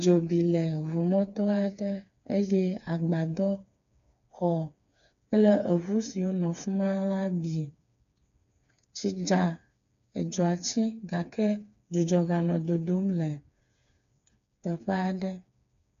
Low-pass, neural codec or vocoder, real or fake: 7.2 kHz; codec, 16 kHz, 4 kbps, FreqCodec, smaller model; fake